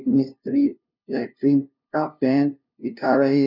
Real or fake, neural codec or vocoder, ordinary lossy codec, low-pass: fake; codec, 16 kHz, 0.5 kbps, FunCodec, trained on LibriTTS, 25 frames a second; none; 5.4 kHz